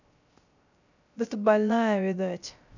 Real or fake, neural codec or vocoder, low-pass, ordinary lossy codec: fake; codec, 16 kHz, 0.3 kbps, FocalCodec; 7.2 kHz; none